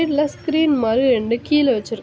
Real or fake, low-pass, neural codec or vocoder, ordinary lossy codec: real; none; none; none